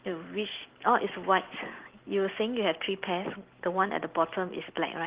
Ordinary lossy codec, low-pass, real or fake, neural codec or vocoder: Opus, 16 kbps; 3.6 kHz; real; none